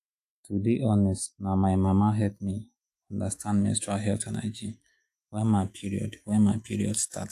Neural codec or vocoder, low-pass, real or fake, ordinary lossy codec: autoencoder, 48 kHz, 128 numbers a frame, DAC-VAE, trained on Japanese speech; 14.4 kHz; fake; AAC, 64 kbps